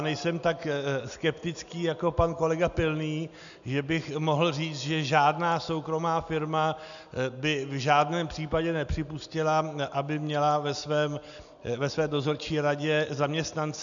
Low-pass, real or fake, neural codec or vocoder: 7.2 kHz; real; none